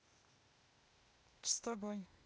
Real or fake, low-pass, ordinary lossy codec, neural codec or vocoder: fake; none; none; codec, 16 kHz, 0.8 kbps, ZipCodec